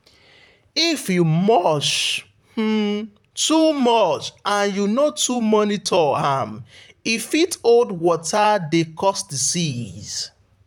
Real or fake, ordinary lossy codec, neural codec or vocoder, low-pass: fake; none; vocoder, 44.1 kHz, 128 mel bands, Pupu-Vocoder; 19.8 kHz